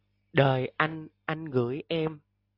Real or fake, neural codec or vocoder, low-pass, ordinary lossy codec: real; none; 5.4 kHz; AAC, 32 kbps